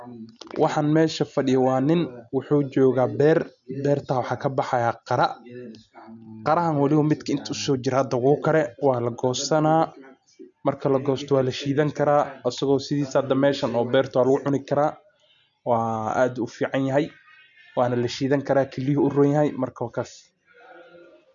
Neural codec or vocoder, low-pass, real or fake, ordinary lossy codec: none; 7.2 kHz; real; none